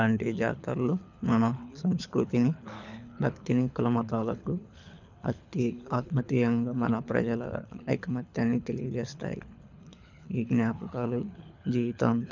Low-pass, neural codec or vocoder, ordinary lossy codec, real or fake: 7.2 kHz; codec, 24 kHz, 6 kbps, HILCodec; none; fake